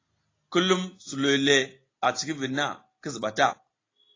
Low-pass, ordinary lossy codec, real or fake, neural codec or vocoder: 7.2 kHz; AAC, 32 kbps; real; none